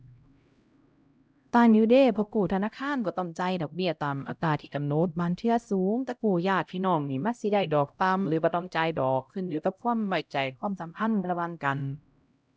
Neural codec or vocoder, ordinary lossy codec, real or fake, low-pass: codec, 16 kHz, 0.5 kbps, X-Codec, HuBERT features, trained on LibriSpeech; none; fake; none